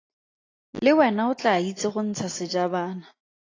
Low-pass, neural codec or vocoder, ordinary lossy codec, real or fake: 7.2 kHz; none; AAC, 32 kbps; real